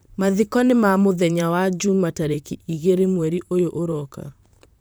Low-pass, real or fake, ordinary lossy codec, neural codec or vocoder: none; fake; none; vocoder, 44.1 kHz, 128 mel bands, Pupu-Vocoder